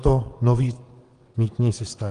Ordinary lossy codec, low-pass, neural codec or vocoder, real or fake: Opus, 24 kbps; 9.9 kHz; vocoder, 22.05 kHz, 80 mel bands, WaveNeXt; fake